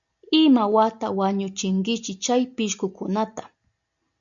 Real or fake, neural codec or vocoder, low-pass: real; none; 7.2 kHz